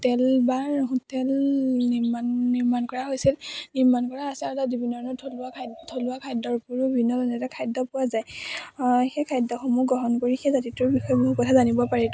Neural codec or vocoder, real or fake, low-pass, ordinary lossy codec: none; real; none; none